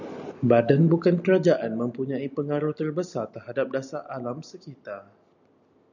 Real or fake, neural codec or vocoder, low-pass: real; none; 7.2 kHz